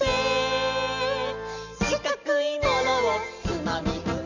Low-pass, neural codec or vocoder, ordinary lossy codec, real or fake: 7.2 kHz; none; none; real